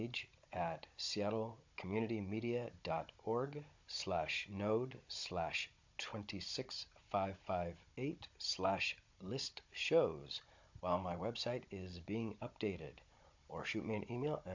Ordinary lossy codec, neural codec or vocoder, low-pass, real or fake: MP3, 48 kbps; codec, 16 kHz, 16 kbps, FunCodec, trained on Chinese and English, 50 frames a second; 7.2 kHz; fake